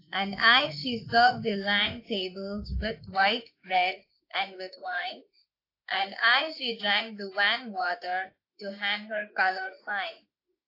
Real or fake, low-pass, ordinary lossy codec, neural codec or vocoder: fake; 5.4 kHz; AAC, 32 kbps; autoencoder, 48 kHz, 32 numbers a frame, DAC-VAE, trained on Japanese speech